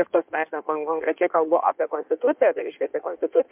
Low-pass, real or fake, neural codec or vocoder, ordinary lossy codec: 3.6 kHz; fake; codec, 16 kHz in and 24 kHz out, 1.1 kbps, FireRedTTS-2 codec; MP3, 32 kbps